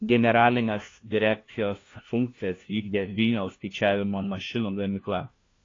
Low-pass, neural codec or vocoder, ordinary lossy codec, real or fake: 7.2 kHz; codec, 16 kHz, 1 kbps, FunCodec, trained on LibriTTS, 50 frames a second; AAC, 32 kbps; fake